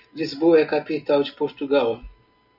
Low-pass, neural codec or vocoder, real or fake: 5.4 kHz; none; real